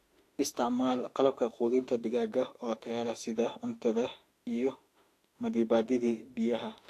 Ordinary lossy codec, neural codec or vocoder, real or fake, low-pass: AAC, 64 kbps; autoencoder, 48 kHz, 32 numbers a frame, DAC-VAE, trained on Japanese speech; fake; 14.4 kHz